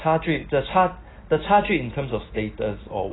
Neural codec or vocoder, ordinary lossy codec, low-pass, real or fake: none; AAC, 16 kbps; 7.2 kHz; real